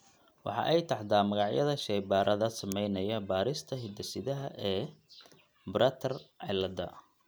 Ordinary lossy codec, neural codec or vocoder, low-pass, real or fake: none; none; none; real